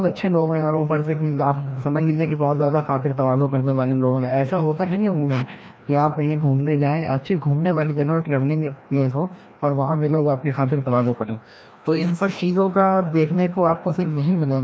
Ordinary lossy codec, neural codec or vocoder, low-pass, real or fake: none; codec, 16 kHz, 1 kbps, FreqCodec, larger model; none; fake